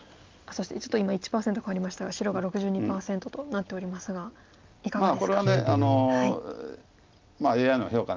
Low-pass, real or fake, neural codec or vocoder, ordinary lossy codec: 7.2 kHz; real; none; Opus, 24 kbps